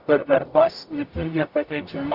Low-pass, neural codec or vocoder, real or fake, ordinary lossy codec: 5.4 kHz; codec, 44.1 kHz, 0.9 kbps, DAC; fake; Opus, 64 kbps